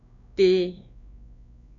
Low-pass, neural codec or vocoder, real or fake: 7.2 kHz; codec, 16 kHz, 2 kbps, X-Codec, WavLM features, trained on Multilingual LibriSpeech; fake